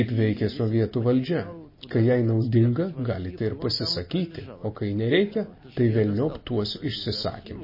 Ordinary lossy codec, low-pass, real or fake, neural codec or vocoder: MP3, 24 kbps; 5.4 kHz; real; none